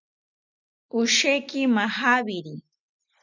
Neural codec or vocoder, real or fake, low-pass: none; real; 7.2 kHz